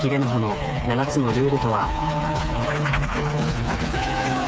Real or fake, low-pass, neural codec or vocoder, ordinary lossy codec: fake; none; codec, 16 kHz, 4 kbps, FreqCodec, smaller model; none